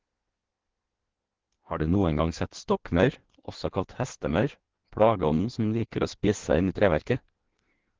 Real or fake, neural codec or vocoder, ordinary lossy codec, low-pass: fake; codec, 16 kHz in and 24 kHz out, 1.1 kbps, FireRedTTS-2 codec; Opus, 16 kbps; 7.2 kHz